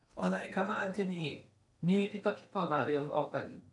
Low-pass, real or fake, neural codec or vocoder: 10.8 kHz; fake; codec, 16 kHz in and 24 kHz out, 0.8 kbps, FocalCodec, streaming, 65536 codes